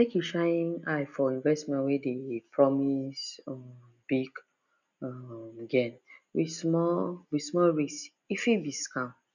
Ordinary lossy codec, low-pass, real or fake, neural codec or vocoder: none; 7.2 kHz; real; none